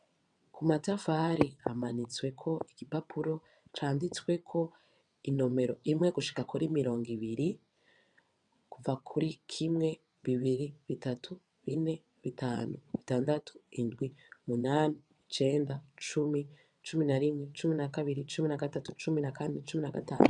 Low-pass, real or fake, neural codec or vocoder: 9.9 kHz; fake; vocoder, 22.05 kHz, 80 mel bands, WaveNeXt